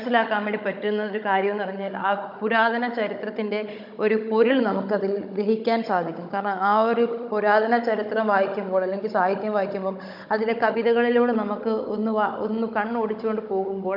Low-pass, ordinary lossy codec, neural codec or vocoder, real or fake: 5.4 kHz; none; codec, 16 kHz, 16 kbps, FunCodec, trained on Chinese and English, 50 frames a second; fake